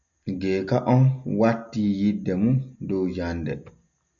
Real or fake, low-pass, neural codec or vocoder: real; 7.2 kHz; none